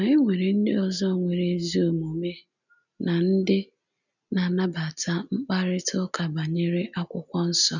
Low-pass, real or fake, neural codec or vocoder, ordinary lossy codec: 7.2 kHz; real; none; none